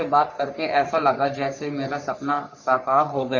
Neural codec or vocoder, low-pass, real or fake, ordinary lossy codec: codec, 44.1 kHz, 3.4 kbps, Pupu-Codec; 7.2 kHz; fake; Opus, 64 kbps